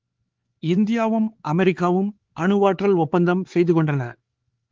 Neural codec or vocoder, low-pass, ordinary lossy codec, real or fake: codec, 16 kHz, 4 kbps, X-Codec, HuBERT features, trained on LibriSpeech; 7.2 kHz; Opus, 16 kbps; fake